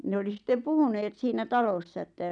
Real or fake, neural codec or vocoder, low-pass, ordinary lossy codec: real; none; 9.9 kHz; none